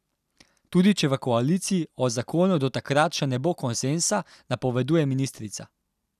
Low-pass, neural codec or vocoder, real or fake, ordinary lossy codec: 14.4 kHz; none; real; none